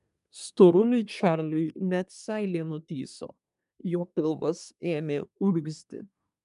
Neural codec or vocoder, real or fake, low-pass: codec, 24 kHz, 1 kbps, SNAC; fake; 10.8 kHz